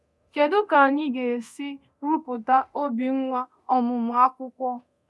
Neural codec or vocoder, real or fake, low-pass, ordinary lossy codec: codec, 24 kHz, 0.9 kbps, DualCodec; fake; none; none